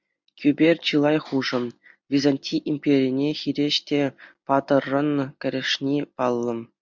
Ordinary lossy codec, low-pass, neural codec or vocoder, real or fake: MP3, 64 kbps; 7.2 kHz; none; real